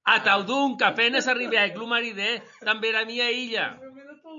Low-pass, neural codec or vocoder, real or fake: 7.2 kHz; none; real